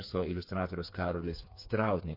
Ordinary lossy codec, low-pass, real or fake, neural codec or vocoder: MP3, 32 kbps; 5.4 kHz; fake; codec, 16 kHz, 4 kbps, FreqCodec, smaller model